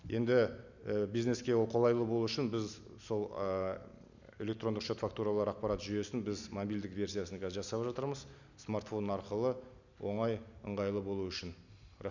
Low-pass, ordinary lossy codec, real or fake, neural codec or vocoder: 7.2 kHz; none; real; none